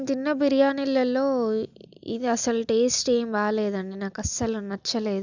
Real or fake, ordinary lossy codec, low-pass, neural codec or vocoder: real; none; 7.2 kHz; none